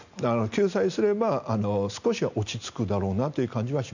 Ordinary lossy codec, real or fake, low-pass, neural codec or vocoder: none; real; 7.2 kHz; none